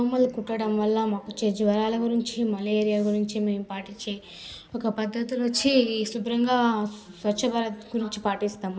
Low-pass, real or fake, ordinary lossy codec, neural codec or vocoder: none; real; none; none